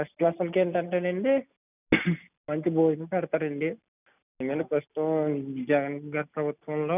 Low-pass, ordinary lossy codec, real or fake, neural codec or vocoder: 3.6 kHz; none; real; none